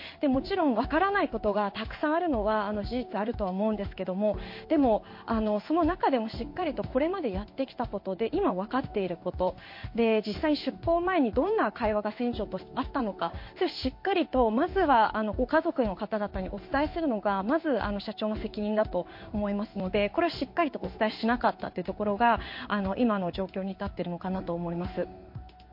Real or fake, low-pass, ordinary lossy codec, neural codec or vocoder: fake; 5.4 kHz; MP3, 32 kbps; codec, 16 kHz in and 24 kHz out, 1 kbps, XY-Tokenizer